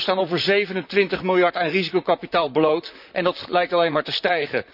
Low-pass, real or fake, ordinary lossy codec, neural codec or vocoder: 5.4 kHz; fake; none; vocoder, 44.1 kHz, 128 mel bands, Pupu-Vocoder